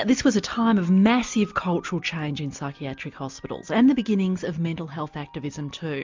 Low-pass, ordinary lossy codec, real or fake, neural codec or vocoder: 7.2 kHz; MP3, 64 kbps; real; none